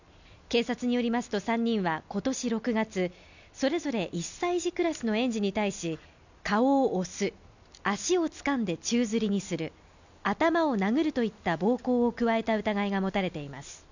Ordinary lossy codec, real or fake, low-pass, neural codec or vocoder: none; real; 7.2 kHz; none